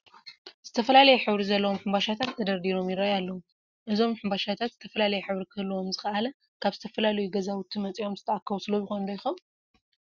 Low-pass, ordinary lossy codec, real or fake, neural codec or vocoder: 7.2 kHz; Opus, 64 kbps; real; none